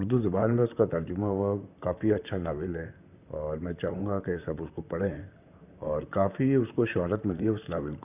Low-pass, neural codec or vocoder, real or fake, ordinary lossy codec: 3.6 kHz; vocoder, 44.1 kHz, 128 mel bands, Pupu-Vocoder; fake; none